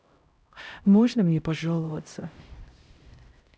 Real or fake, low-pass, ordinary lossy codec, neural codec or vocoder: fake; none; none; codec, 16 kHz, 0.5 kbps, X-Codec, HuBERT features, trained on LibriSpeech